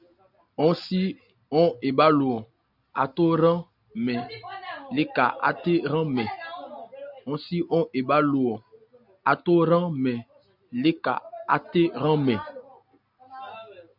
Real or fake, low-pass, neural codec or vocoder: real; 5.4 kHz; none